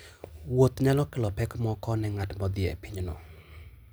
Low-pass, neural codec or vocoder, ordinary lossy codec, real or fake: none; none; none; real